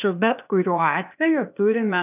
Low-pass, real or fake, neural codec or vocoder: 3.6 kHz; fake; codec, 16 kHz, 0.8 kbps, ZipCodec